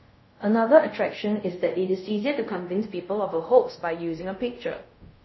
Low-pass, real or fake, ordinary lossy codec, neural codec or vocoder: 7.2 kHz; fake; MP3, 24 kbps; codec, 24 kHz, 0.5 kbps, DualCodec